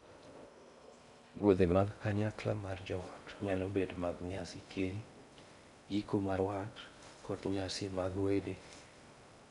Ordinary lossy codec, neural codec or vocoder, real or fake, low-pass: none; codec, 16 kHz in and 24 kHz out, 0.6 kbps, FocalCodec, streaming, 2048 codes; fake; 10.8 kHz